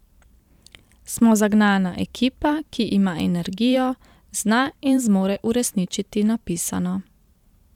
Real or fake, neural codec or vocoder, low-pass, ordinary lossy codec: fake; vocoder, 44.1 kHz, 128 mel bands every 512 samples, BigVGAN v2; 19.8 kHz; none